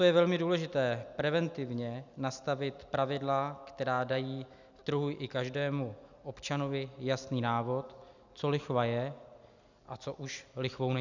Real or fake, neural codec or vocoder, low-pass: real; none; 7.2 kHz